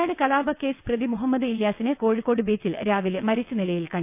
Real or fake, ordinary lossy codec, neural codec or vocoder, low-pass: fake; none; vocoder, 22.05 kHz, 80 mel bands, WaveNeXt; 3.6 kHz